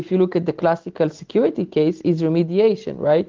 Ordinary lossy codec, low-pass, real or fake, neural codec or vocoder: Opus, 16 kbps; 7.2 kHz; real; none